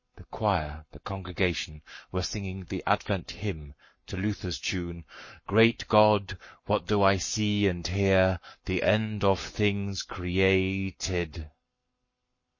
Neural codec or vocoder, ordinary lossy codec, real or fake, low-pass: codec, 44.1 kHz, 7.8 kbps, Pupu-Codec; MP3, 32 kbps; fake; 7.2 kHz